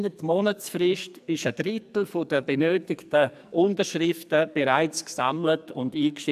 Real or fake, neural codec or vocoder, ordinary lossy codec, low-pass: fake; codec, 44.1 kHz, 2.6 kbps, SNAC; AAC, 96 kbps; 14.4 kHz